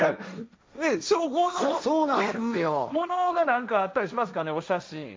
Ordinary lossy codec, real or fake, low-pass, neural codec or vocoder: none; fake; none; codec, 16 kHz, 1.1 kbps, Voila-Tokenizer